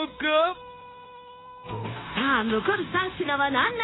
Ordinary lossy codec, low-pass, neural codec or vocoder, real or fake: AAC, 16 kbps; 7.2 kHz; codec, 16 kHz, 0.9 kbps, LongCat-Audio-Codec; fake